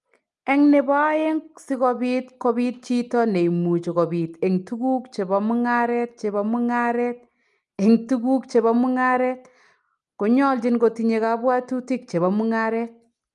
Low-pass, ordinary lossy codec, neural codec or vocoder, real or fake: 10.8 kHz; Opus, 32 kbps; none; real